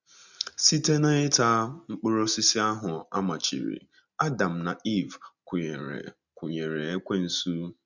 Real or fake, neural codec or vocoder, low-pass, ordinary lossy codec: real; none; 7.2 kHz; none